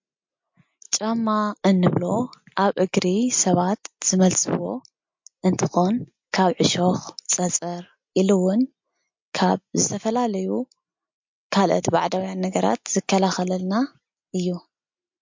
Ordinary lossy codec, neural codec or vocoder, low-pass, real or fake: MP3, 48 kbps; none; 7.2 kHz; real